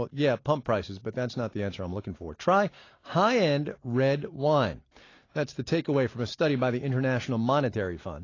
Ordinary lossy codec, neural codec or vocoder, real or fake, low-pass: AAC, 32 kbps; none; real; 7.2 kHz